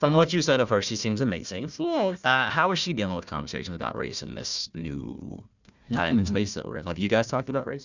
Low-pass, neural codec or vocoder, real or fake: 7.2 kHz; codec, 16 kHz, 1 kbps, FunCodec, trained on Chinese and English, 50 frames a second; fake